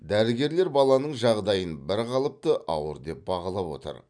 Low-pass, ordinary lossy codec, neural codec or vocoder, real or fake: 9.9 kHz; none; none; real